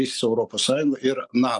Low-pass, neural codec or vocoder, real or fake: 10.8 kHz; none; real